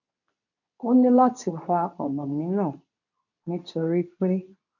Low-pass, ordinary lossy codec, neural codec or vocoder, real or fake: 7.2 kHz; none; codec, 24 kHz, 0.9 kbps, WavTokenizer, medium speech release version 2; fake